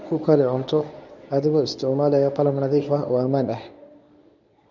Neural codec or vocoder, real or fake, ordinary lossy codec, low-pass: codec, 24 kHz, 0.9 kbps, WavTokenizer, medium speech release version 1; fake; none; 7.2 kHz